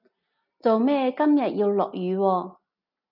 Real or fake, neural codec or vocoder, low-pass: real; none; 5.4 kHz